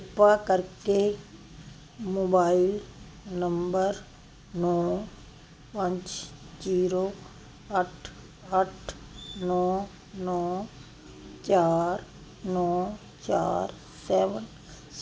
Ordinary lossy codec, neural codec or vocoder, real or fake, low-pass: none; none; real; none